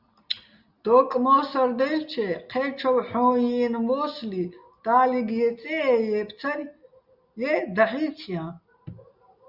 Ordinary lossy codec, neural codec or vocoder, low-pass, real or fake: Opus, 64 kbps; none; 5.4 kHz; real